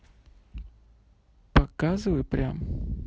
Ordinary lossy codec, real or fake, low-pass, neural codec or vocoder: none; real; none; none